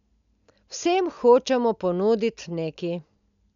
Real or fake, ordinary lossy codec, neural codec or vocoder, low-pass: real; none; none; 7.2 kHz